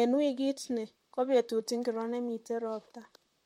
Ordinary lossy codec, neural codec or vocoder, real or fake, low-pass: MP3, 64 kbps; vocoder, 44.1 kHz, 128 mel bands, Pupu-Vocoder; fake; 19.8 kHz